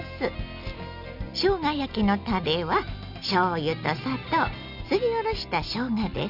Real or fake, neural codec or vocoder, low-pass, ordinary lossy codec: real; none; 5.4 kHz; none